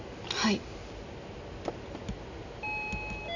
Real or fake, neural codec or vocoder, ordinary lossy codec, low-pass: real; none; none; 7.2 kHz